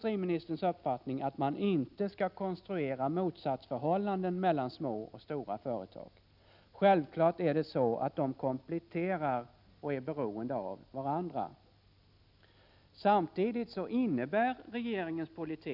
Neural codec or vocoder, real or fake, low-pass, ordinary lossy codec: none; real; 5.4 kHz; none